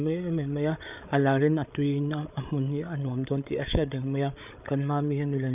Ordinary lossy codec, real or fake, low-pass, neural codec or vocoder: none; fake; 3.6 kHz; codec, 16 kHz, 8 kbps, FreqCodec, larger model